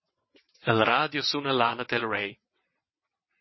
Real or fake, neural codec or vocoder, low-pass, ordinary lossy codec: fake; vocoder, 22.05 kHz, 80 mel bands, WaveNeXt; 7.2 kHz; MP3, 24 kbps